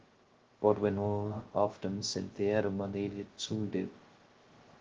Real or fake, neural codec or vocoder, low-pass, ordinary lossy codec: fake; codec, 16 kHz, 0.2 kbps, FocalCodec; 7.2 kHz; Opus, 16 kbps